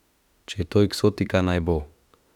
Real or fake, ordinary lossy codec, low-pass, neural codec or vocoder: fake; none; 19.8 kHz; autoencoder, 48 kHz, 32 numbers a frame, DAC-VAE, trained on Japanese speech